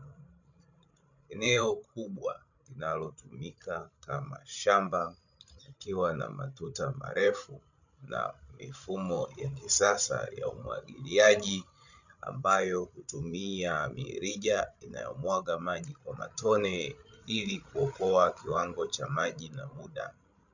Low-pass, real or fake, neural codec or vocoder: 7.2 kHz; fake; codec, 16 kHz, 16 kbps, FreqCodec, larger model